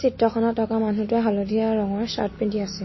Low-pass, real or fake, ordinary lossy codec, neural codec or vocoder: 7.2 kHz; real; MP3, 24 kbps; none